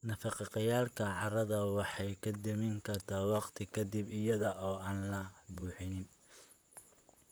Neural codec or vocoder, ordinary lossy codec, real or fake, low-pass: vocoder, 44.1 kHz, 128 mel bands, Pupu-Vocoder; none; fake; none